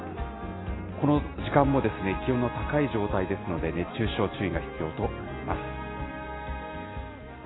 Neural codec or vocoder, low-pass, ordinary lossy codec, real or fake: none; 7.2 kHz; AAC, 16 kbps; real